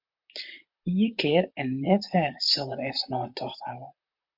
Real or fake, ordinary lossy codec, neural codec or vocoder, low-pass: fake; MP3, 48 kbps; vocoder, 44.1 kHz, 128 mel bands, Pupu-Vocoder; 5.4 kHz